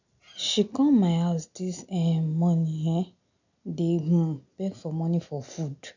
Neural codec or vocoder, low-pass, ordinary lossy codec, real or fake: none; 7.2 kHz; none; real